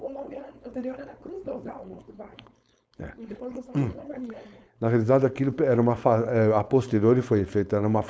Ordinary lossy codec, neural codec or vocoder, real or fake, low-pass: none; codec, 16 kHz, 4.8 kbps, FACodec; fake; none